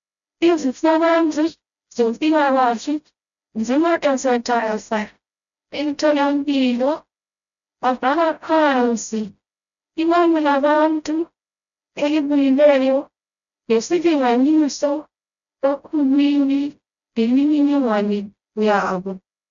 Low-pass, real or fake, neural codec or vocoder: 7.2 kHz; fake; codec, 16 kHz, 0.5 kbps, FreqCodec, smaller model